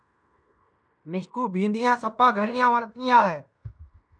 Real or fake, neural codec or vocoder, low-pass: fake; codec, 16 kHz in and 24 kHz out, 0.9 kbps, LongCat-Audio-Codec, fine tuned four codebook decoder; 9.9 kHz